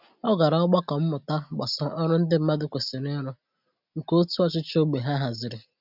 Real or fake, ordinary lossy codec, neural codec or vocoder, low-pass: real; none; none; 5.4 kHz